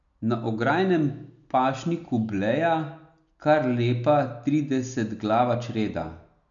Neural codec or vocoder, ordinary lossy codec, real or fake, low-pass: none; none; real; 7.2 kHz